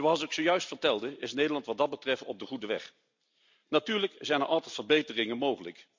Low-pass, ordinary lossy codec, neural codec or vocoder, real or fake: 7.2 kHz; MP3, 64 kbps; none; real